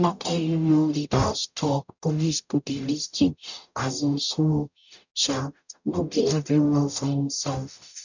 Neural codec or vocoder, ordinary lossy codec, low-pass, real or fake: codec, 44.1 kHz, 0.9 kbps, DAC; none; 7.2 kHz; fake